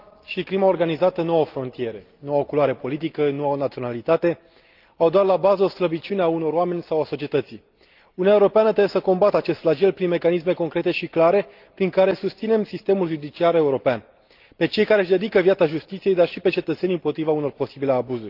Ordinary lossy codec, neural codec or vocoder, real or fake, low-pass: Opus, 32 kbps; none; real; 5.4 kHz